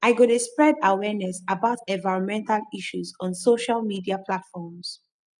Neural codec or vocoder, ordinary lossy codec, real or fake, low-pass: none; none; real; 10.8 kHz